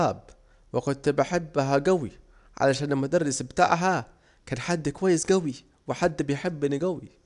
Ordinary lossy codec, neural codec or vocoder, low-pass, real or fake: none; none; 10.8 kHz; real